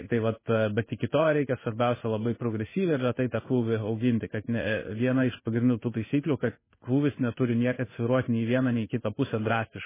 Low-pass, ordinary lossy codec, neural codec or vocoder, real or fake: 3.6 kHz; MP3, 16 kbps; codec, 16 kHz in and 24 kHz out, 1 kbps, XY-Tokenizer; fake